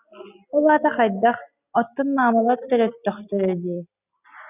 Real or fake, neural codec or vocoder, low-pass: real; none; 3.6 kHz